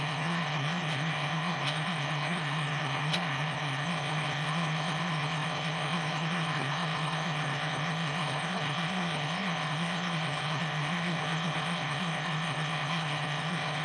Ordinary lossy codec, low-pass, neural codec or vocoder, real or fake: none; none; autoencoder, 22.05 kHz, a latent of 192 numbers a frame, VITS, trained on one speaker; fake